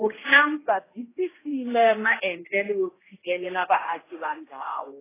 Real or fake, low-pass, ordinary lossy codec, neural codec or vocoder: fake; 3.6 kHz; AAC, 16 kbps; codec, 16 kHz, 1 kbps, X-Codec, HuBERT features, trained on general audio